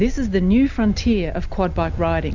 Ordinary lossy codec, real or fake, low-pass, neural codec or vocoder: Opus, 64 kbps; real; 7.2 kHz; none